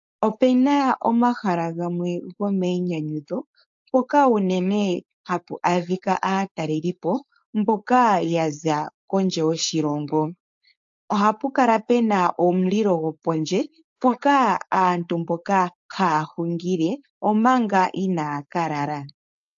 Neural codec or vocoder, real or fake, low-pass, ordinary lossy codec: codec, 16 kHz, 4.8 kbps, FACodec; fake; 7.2 kHz; AAC, 64 kbps